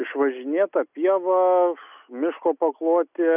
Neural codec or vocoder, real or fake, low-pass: none; real; 3.6 kHz